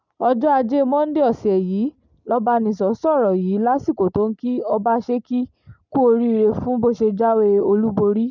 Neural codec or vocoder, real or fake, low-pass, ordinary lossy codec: none; real; 7.2 kHz; none